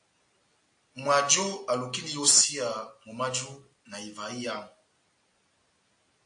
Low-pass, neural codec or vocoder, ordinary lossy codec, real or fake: 9.9 kHz; none; AAC, 64 kbps; real